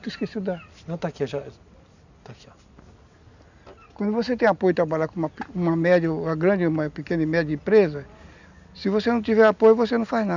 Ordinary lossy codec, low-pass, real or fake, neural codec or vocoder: none; 7.2 kHz; real; none